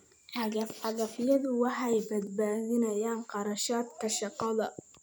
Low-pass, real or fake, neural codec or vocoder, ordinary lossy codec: none; fake; vocoder, 44.1 kHz, 128 mel bands every 256 samples, BigVGAN v2; none